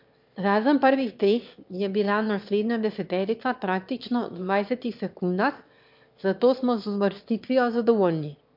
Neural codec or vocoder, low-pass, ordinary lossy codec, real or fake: autoencoder, 22.05 kHz, a latent of 192 numbers a frame, VITS, trained on one speaker; 5.4 kHz; MP3, 48 kbps; fake